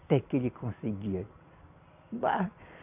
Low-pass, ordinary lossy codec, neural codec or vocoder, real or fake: 3.6 kHz; none; none; real